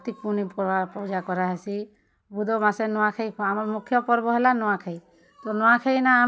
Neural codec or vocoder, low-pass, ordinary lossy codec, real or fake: none; none; none; real